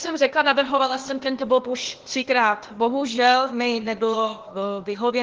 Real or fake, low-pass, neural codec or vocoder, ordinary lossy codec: fake; 7.2 kHz; codec, 16 kHz, 0.8 kbps, ZipCodec; Opus, 16 kbps